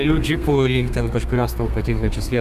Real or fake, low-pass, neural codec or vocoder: fake; 14.4 kHz; codec, 32 kHz, 1.9 kbps, SNAC